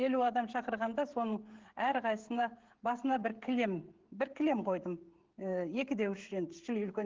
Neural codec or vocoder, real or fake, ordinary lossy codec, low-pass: codec, 16 kHz, 16 kbps, FreqCodec, smaller model; fake; Opus, 16 kbps; 7.2 kHz